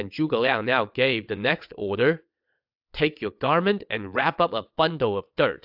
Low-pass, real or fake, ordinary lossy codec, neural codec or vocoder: 5.4 kHz; fake; AAC, 48 kbps; vocoder, 22.05 kHz, 80 mel bands, WaveNeXt